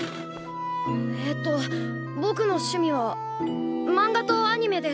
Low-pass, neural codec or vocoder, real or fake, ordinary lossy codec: none; none; real; none